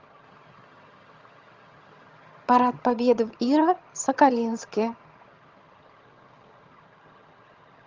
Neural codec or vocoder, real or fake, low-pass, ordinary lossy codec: vocoder, 22.05 kHz, 80 mel bands, HiFi-GAN; fake; 7.2 kHz; Opus, 32 kbps